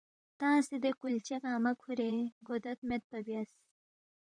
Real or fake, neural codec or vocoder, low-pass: fake; vocoder, 44.1 kHz, 128 mel bands, Pupu-Vocoder; 9.9 kHz